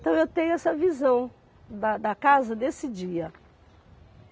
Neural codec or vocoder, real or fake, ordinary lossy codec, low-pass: none; real; none; none